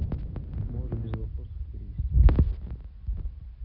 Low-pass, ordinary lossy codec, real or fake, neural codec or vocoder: 5.4 kHz; Opus, 64 kbps; real; none